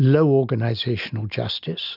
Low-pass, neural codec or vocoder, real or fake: 5.4 kHz; none; real